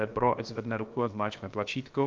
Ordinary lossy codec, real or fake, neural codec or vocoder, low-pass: Opus, 24 kbps; fake; codec, 16 kHz, about 1 kbps, DyCAST, with the encoder's durations; 7.2 kHz